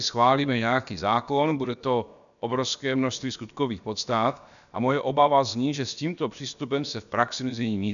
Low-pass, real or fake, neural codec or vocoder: 7.2 kHz; fake; codec, 16 kHz, about 1 kbps, DyCAST, with the encoder's durations